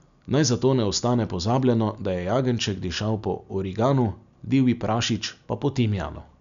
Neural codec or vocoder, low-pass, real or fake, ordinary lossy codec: none; 7.2 kHz; real; none